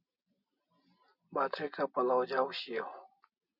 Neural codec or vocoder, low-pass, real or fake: none; 5.4 kHz; real